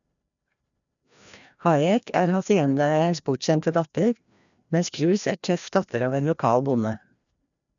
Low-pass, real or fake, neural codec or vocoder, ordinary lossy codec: 7.2 kHz; fake; codec, 16 kHz, 1 kbps, FreqCodec, larger model; none